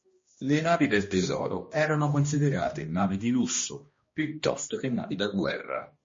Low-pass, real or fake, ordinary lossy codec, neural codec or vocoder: 7.2 kHz; fake; MP3, 32 kbps; codec, 16 kHz, 1 kbps, X-Codec, HuBERT features, trained on balanced general audio